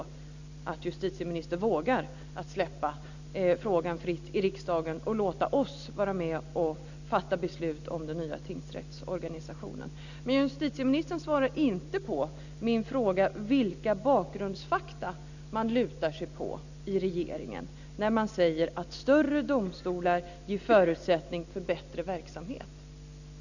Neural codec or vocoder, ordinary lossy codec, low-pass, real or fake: none; none; 7.2 kHz; real